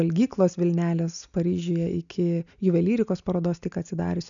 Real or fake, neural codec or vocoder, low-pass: real; none; 7.2 kHz